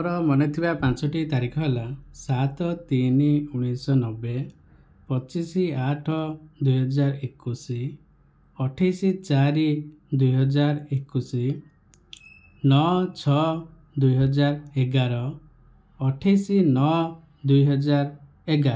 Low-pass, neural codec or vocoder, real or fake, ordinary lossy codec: none; none; real; none